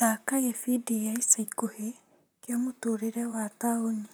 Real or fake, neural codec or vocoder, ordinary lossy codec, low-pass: fake; vocoder, 44.1 kHz, 128 mel bands, Pupu-Vocoder; none; none